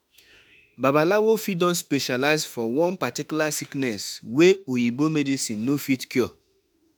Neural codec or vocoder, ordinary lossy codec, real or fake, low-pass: autoencoder, 48 kHz, 32 numbers a frame, DAC-VAE, trained on Japanese speech; none; fake; none